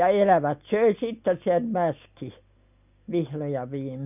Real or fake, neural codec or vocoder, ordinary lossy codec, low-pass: real; none; none; 3.6 kHz